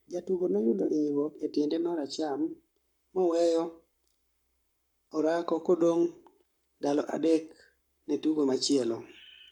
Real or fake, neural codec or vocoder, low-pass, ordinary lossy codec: fake; codec, 44.1 kHz, 7.8 kbps, Pupu-Codec; 19.8 kHz; none